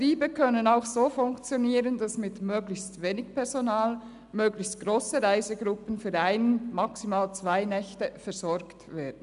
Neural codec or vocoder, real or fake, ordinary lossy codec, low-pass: none; real; none; 10.8 kHz